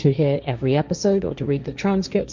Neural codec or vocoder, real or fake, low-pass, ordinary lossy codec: codec, 16 kHz, 1.1 kbps, Voila-Tokenizer; fake; 7.2 kHz; Opus, 64 kbps